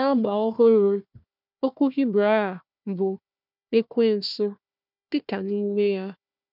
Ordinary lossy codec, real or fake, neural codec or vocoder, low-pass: none; fake; codec, 16 kHz, 1 kbps, FunCodec, trained on Chinese and English, 50 frames a second; 5.4 kHz